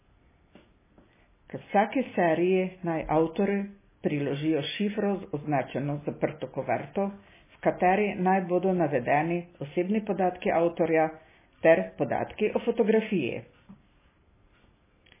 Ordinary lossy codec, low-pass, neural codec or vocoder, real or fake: MP3, 16 kbps; 3.6 kHz; none; real